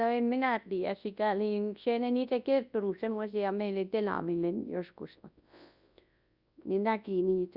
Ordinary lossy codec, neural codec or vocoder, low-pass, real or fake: Opus, 64 kbps; codec, 24 kHz, 0.9 kbps, WavTokenizer, large speech release; 5.4 kHz; fake